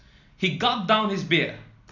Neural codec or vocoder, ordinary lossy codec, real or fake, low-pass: none; none; real; 7.2 kHz